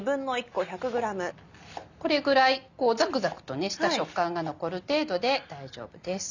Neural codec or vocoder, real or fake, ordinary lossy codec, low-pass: none; real; none; 7.2 kHz